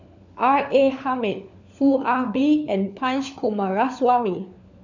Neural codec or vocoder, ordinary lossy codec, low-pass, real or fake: codec, 16 kHz, 4 kbps, FunCodec, trained on LibriTTS, 50 frames a second; none; 7.2 kHz; fake